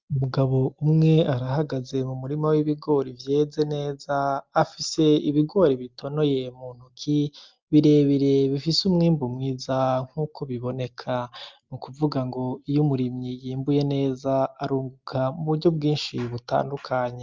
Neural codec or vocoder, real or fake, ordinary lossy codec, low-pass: none; real; Opus, 24 kbps; 7.2 kHz